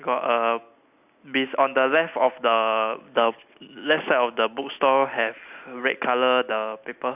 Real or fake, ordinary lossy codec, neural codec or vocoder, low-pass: real; none; none; 3.6 kHz